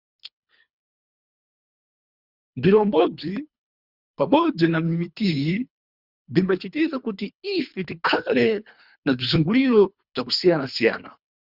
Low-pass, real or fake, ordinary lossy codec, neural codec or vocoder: 5.4 kHz; fake; Opus, 64 kbps; codec, 24 kHz, 3 kbps, HILCodec